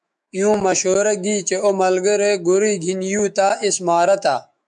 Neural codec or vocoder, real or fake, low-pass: autoencoder, 48 kHz, 128 numbers a frame, DAC-VAE, trained on Japanese speech; fake; 10.8 kHz